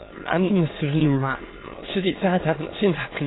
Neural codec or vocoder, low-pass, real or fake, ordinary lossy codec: autoencoder, 22.05 kHz, a latent of 192 numbers a frame, VITS, trained on many speakers; 7.2 kHz; fake; AAC, 16 kbps